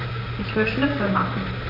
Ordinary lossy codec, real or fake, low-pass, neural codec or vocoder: none; fake; 5.4 kHz; vocoder, 44.1 kHz, 128 mel bands, Pupu-Vocoder